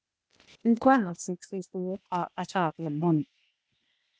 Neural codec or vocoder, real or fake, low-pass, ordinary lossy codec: codec, 16 kHz, 0.8 kbps, ZipCodec; fake; none; none